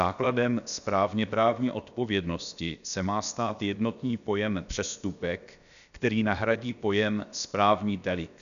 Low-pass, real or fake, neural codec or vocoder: 7.2 kHz; fake; codec, 16 kHz, about 1 kbps, DyCAST, with the encoder's durations